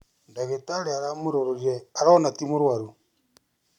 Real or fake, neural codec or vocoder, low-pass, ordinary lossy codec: real; none; 19.8 kHz; none